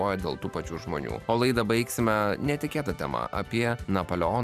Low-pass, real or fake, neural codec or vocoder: 14.4 kHz; fake; vocoder, 44.1 kHz, 128 mel bands every 512 samples, BigVGAN v2